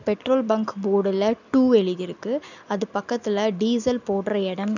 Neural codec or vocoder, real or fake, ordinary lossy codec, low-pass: none; real; none; 7.2 kHz